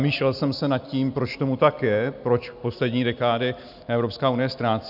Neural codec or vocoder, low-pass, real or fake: none; 5.4 kHz; real